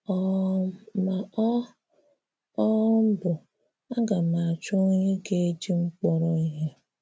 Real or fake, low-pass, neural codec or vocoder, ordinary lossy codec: real; none; none; none